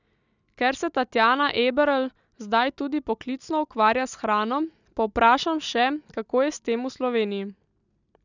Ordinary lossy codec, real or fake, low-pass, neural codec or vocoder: none; real; 7.2 kHz; none